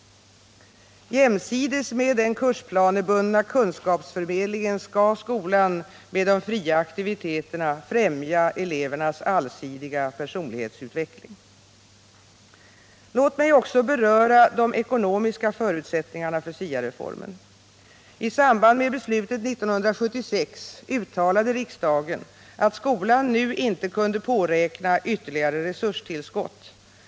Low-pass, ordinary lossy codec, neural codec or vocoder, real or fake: none; none; none; real